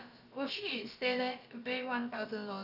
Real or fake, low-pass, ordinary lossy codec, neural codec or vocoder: fake; 5.4 kHz; none; codec, 16 kHz, about 1 kbps, DyCAST, with the encoder's durations